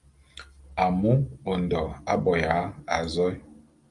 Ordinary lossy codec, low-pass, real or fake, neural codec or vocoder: Opus, 32 kbps; 10.8 kHz; real; none